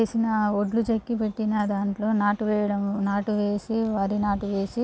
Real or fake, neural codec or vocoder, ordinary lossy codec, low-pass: real; none; none; none